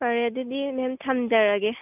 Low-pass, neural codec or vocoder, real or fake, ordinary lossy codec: 3.6 kHz; none; real; none